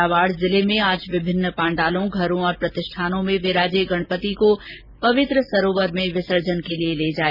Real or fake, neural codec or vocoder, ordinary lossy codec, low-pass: real; none; Opus, 64 kbps; 5.4 kHz